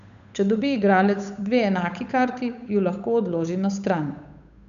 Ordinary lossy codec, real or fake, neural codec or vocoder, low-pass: none; fake; codec, 16 kHz, 8 kbps, FunCodec, trained on Chinese and English, 25 frames a second; 7.2 kHz